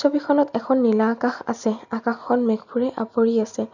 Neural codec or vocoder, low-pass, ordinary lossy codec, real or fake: none; 7.2 kHz; none; real